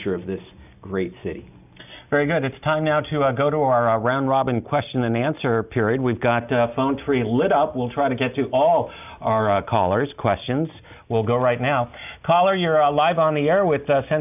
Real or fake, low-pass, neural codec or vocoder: real; 3.6 kHz; none